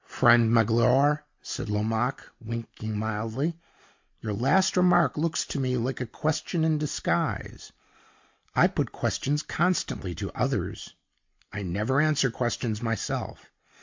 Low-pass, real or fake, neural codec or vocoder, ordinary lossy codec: 7.2 kHz; real; none; MP3, 48 kbps